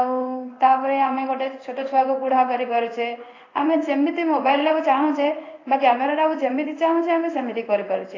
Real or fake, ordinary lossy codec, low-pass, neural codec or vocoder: fake; AAC, 32 kbps; 7.2 kHz; codec, 16 kHz in and 24 kHz out, 1 kbps, XY-Tokenizer